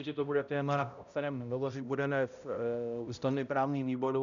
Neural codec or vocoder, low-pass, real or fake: codec, 16 kHz, 0.5 kbps, X-Codec, HuBERT features, trained on balanced general audio; 7.2 kHz; fake